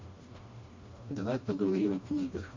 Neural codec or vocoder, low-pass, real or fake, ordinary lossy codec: codec, 16 kHz, 1 kbps, FreqCodec, smaller model; 7.2 kHz; fake; MP3, 32 kbps